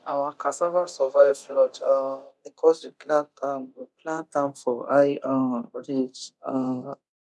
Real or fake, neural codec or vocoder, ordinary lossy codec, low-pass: fake; codec, 24 kHz, 0.9 kbps, DualCodec; none; none